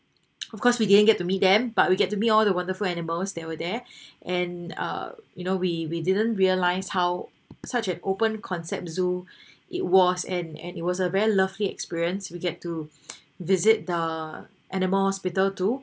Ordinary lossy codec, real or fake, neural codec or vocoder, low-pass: none; real; none; none